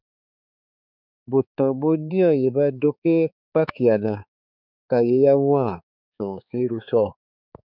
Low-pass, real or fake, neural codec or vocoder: 5.4 kHz; fake; codec, 16 kHz, 4 kbps, X-Codec, HuBERT features, trained on balanced general audio